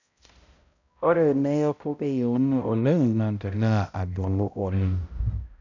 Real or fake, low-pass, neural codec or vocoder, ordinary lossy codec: fake; 7.2 kHz; codec, 16 kHz, 0.5 kbps, X-Codec, HuBERT features, trained on balanced general audio; none